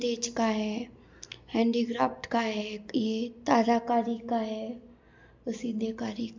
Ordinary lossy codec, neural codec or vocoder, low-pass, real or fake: MP3, 64 kbps; none; 7.2 kHz; real